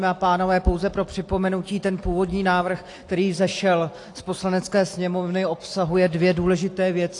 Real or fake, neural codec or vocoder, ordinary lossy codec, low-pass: real; none; AAC, 48 kbps; 10.8 kHz